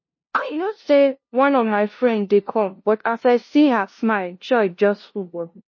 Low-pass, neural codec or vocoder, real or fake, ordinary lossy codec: 7.2 kHz; codec, 16 kHz, 0.5 kbps, FunCodec, trained on LibriTTS, 25 frames a second; fake; MP3, 32 kbps